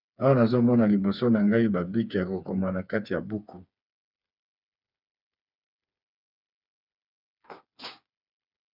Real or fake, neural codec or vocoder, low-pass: fake; codec, 16 kHz, 4 kbps, FreqCodec, smaller model; 5.4 kHz